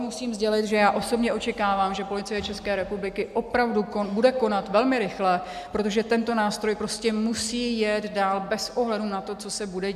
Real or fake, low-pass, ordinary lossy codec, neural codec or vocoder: real; 14.4 kHz; AAC, 96 kbps; none